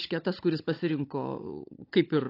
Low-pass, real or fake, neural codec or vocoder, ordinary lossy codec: 5.4 kHz; fake; codec, 16 kHz, 16 kbps, FunCodec, trained on Chinese and English, 50 frames a second; AAC, 32 kbps